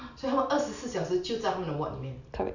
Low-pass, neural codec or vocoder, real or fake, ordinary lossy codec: 7.2 kHz; none; real; none